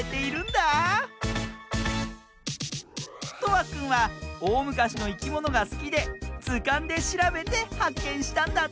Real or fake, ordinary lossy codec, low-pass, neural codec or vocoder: real; none; none; none